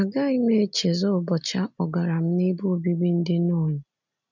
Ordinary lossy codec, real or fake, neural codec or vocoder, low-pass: none; real; none; 7.2 kHz